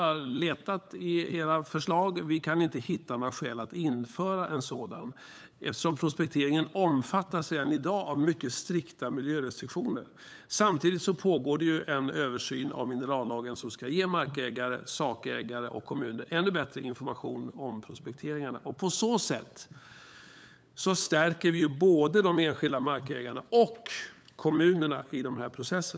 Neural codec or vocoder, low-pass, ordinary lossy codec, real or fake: codec, 16 kHz, 8 kbps, FunCodec, trained on LibriTTS, 25 frames a second; none; none; fake